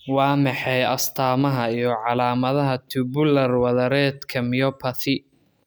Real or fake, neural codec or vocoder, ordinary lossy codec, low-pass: real; none; none; none